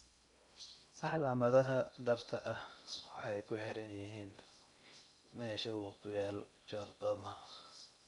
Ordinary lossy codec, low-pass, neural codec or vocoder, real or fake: none; 10.8 kHz; codec, 16 kHz in and 24 kHz out, 0.6 kbps, FocalCodec, streaming, 2048 codes; fake